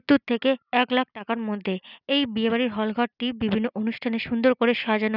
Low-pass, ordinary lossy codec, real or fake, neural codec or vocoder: 5.4 kHz; none; real; none